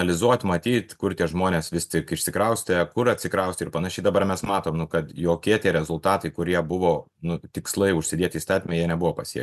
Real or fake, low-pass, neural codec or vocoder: real; 14.4 kHz; none